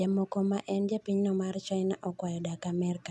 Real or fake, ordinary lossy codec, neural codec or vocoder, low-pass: real; none; none; none